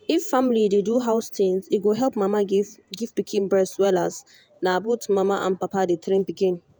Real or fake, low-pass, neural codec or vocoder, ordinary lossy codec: fake; none; vocoder, 48 kHz, 128 mel bands, Vocos; none